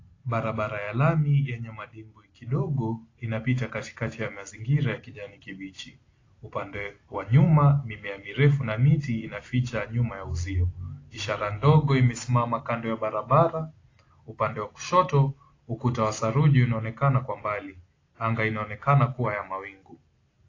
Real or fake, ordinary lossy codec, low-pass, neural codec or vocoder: real; AAC, 32 kbps; 7.2 kHz; none